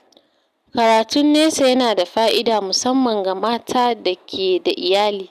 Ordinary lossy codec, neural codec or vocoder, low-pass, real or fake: none; none; 14.4 kHz; real